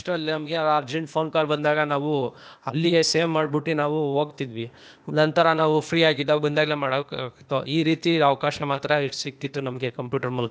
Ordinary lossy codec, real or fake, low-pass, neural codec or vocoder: none; fake; none; codec, 16 kHz, 0.8 kbps, ZipCodec